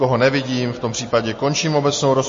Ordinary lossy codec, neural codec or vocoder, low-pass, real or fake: MP3, 32 kbps; none; 7.2 kHz; real